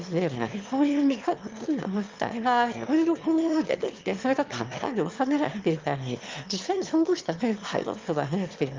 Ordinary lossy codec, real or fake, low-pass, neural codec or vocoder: Opus, 32 kbps; fake; 7.2 kHz; autoencoder, 22.05 kHz, a latent of 192 numbers a frame, VITS, trained on one speaker